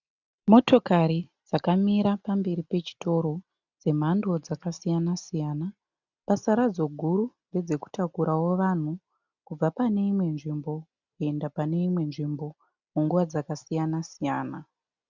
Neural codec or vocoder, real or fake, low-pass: none; real; 7.2 kHz